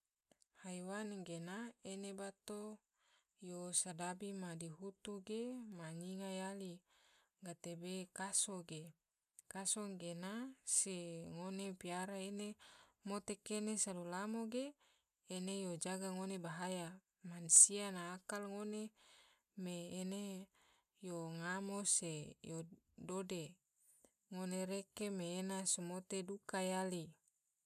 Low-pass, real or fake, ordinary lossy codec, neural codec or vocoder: 14.4 kHz; real; none; none